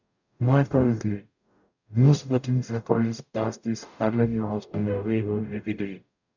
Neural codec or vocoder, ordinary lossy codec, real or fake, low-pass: codec, 44.1 kHz, 0.9 kbps, DAC; none; fake; 7.2 kHz